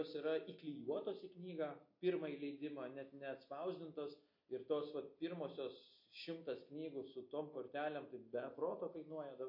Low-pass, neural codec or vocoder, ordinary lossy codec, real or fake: 5.4 kHz; none; MP3, 32 kbps; real